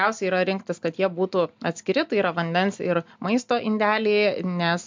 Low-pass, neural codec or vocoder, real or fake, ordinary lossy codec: 7.2 kHz; none; real; MP3, 64 kbps